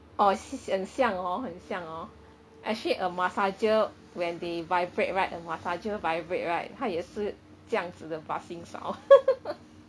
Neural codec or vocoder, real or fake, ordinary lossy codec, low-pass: none; real; none; none